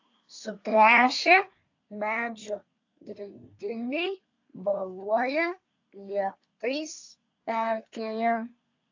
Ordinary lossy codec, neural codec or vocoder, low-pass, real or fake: AAC, 48 kbps; codec, 24 kHz, 1 kbps, SNAC; 7.2 kHz; fake